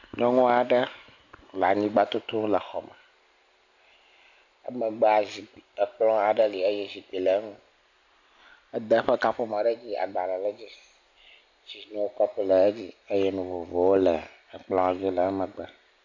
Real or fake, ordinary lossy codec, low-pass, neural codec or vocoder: real; AAC, 48 kbps; 7.2 kHz; none